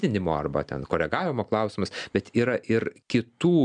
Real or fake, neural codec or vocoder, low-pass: real; none; 9.9 kHz